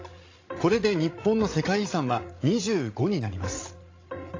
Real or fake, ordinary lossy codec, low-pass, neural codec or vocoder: fake; AAC, 32 kbps; 7.2 kHz; codec, 16 kHz, 16 kbps, FreqCodec, larger model